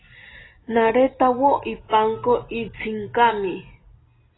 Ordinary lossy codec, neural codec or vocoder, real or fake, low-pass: AAC, 16 kbps; none; real; 7.2 kHz